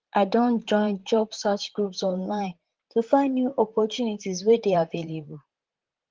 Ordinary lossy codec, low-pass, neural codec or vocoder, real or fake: Opus, 16 kbps; 7.2 kHz; vocoder, 44.1 kHz, 128 mel bands, Pupu-Vocoder; fake